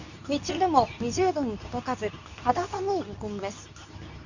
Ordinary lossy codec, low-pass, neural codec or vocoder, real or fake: none; 7.2 kHz; codec, 24 kHz, 0.9 kbps, WavTokenizer, medium speech release version 2; fake